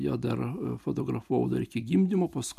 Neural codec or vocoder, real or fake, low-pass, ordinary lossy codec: none; real; 14.4 kHz; AAC, 96 kbps